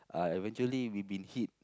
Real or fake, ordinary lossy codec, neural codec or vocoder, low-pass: real; none; none; none